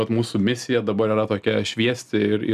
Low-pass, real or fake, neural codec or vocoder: 14.4 kHz; real; none